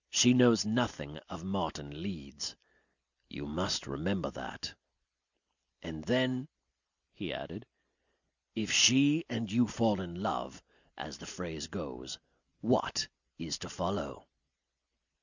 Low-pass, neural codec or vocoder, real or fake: 7.2 kHz; none; real